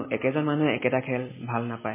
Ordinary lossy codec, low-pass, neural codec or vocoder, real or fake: MP3, 16 kbps; 3.6 kHz; none; real